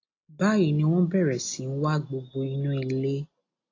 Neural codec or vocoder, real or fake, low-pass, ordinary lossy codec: none; real; 7.2 kHz; AAC, 32 kbps